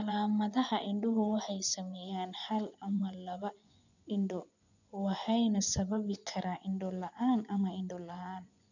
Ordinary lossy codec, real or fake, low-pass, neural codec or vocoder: none; fake; 7.2 kHz; vocoder, 24 kHz, 100 mel bands, Vocos